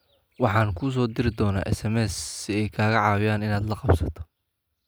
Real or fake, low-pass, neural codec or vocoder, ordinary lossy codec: real; none; none; none